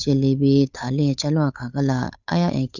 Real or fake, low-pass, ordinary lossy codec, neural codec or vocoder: fake; 7.2 kHz; none; codec, 16 kHz, 8 kbps, FunCodec, trained on Chinese and English, 25 frames a second